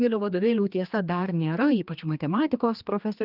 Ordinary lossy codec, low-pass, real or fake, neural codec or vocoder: Opus, 32 kbps; 5.4 kHz; fake; codec, 16 kHz, 2 kbps, X-Codec, HuBERT features, trained on general audio